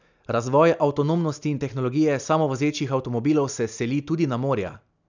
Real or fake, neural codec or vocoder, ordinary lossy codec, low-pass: real; none; none; 7.2 kHz